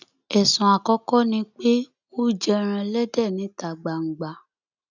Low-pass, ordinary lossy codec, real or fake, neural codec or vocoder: 7.2 kHz; none; real; none